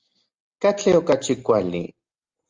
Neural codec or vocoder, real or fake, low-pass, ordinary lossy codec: none; real; 7.2 kHz; Opus, 32 kbps